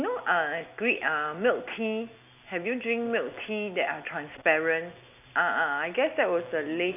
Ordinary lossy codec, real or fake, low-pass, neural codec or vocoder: none; real; 3.6 kHz; none